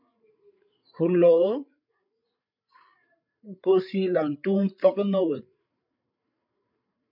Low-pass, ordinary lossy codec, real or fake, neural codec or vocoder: 5.4 kHz; MP3, 48 kbps; fake; codec, 16 kHz, 8 kbps, FreqCodec, larger model